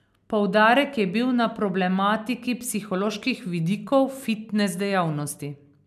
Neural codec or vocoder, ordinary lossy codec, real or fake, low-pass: none; none; real; 14.4 kHz